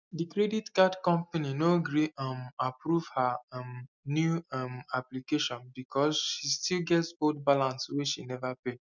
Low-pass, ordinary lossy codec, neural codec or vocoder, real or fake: 7.2 kHz; none; none; real